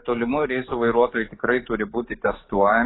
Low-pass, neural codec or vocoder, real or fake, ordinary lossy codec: 7.2 kHz; codec, 44.1 kHz, 7.8 kbps, DAC; fake; AAC, 16 kbps